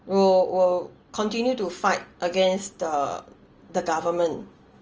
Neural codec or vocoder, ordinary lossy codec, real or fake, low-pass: none; Opus, 24 kbps; real; 7.2 kHz